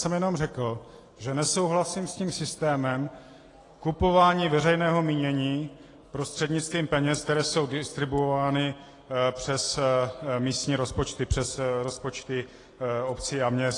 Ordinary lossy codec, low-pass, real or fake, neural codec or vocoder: AAC, 32 kbps; 10.8 kHz; real; none